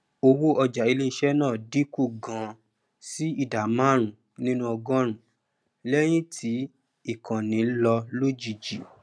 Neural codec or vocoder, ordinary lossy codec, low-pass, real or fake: none; none; none; real